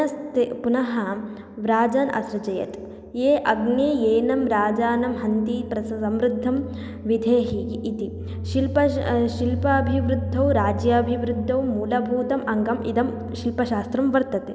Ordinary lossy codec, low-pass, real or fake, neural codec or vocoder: none; none; real; none